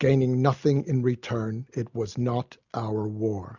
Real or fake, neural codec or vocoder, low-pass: real; none; 7.2 kHz